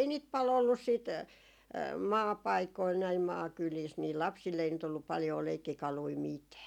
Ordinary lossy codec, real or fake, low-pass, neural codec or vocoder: none; real; 19.8 kHz; none